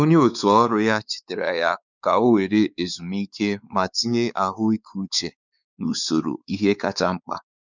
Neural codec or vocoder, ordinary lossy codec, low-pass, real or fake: codec, 16 kHz, 4 kbps, X-Codec, WavLM features, trained on Multilingual LibriSpeech; none; 7.2 kHz; fake